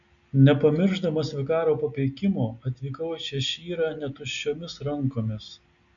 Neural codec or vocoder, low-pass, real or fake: none; 7.2 kHz; real